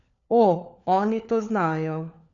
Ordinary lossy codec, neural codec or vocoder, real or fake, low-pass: none; codec, 16 kHz, 4 kbps, FunCodec, trained on LibriTTS, 50 frames a second; fake; 7.2 kHz